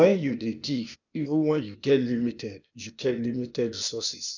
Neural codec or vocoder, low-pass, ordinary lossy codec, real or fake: codec, 16 kHz, 0.8 kbps, ZipCodec; 7.2 kHz; none; fake